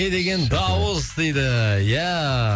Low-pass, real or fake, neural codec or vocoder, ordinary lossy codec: none; real; none; none